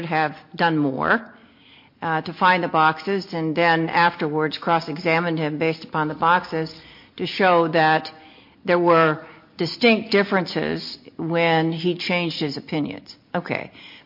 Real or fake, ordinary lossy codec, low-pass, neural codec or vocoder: real; MP3, 32 kbps; 5.4 kHz; none